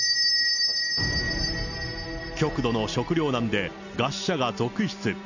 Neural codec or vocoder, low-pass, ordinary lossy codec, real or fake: none; 7.2 kHz; none; real